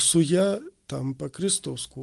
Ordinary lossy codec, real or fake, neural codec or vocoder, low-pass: Opus, 24 kbps; real; none; 10.8 kHz